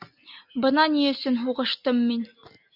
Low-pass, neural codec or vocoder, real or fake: 5.4 kHz; none; real